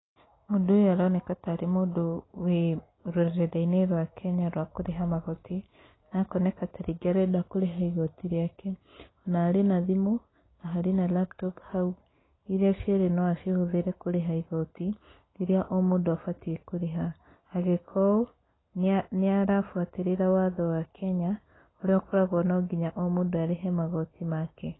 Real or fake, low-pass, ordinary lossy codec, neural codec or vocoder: real; 7.2 kHz; AAC, 16 kbps; none